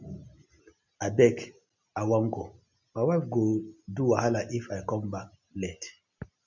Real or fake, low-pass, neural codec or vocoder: real; 7.2 kHz; none